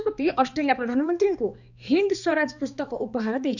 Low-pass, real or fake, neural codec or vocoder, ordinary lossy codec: 7.2 kHz; fake; codec, 16 kHz, 2 kbps, X-Codec, HuBERT features, trained on balanced general audio; none